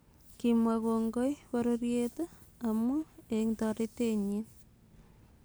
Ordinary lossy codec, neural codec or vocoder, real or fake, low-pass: none; none; real; none